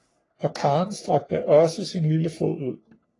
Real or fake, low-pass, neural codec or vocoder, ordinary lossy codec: fake; 10.8 kHz; codec, 44.1 kHz, 3.4 kbps, Pupu-Codec; AAC, 32 kbps